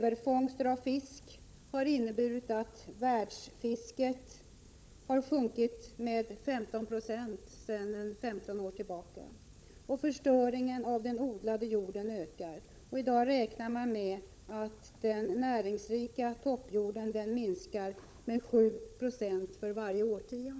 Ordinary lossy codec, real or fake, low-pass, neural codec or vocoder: none; fake; none; codec, 16 kHz, 16 kbps, FunCodec, trained on LibriTTS, 50 frames a second